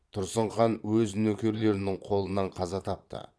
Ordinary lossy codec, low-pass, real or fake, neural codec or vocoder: none; none; fake; vocoder, 22.05 kHz, 80 mel bands, Vocos